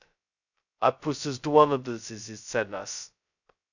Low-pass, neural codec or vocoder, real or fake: 7.2 kHz; codec, 16 kHz, 0.2 kbps, FocalCodec; fake